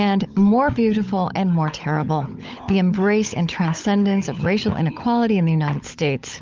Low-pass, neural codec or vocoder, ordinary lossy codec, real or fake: 7.2 kHz; codec, 16 kHz, 16 kbps, FunCodec, trained on LibriTTS, 50 frames a second; Opus, 24 kbps; fake